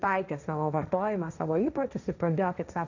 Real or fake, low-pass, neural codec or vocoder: fake; 7.2 kHz; codec, 16 kHz, 1.1 kbps, Voila-Tokenizer